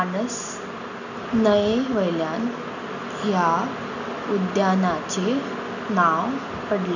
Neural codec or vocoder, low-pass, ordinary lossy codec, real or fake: none; 7.2 kHz; none; real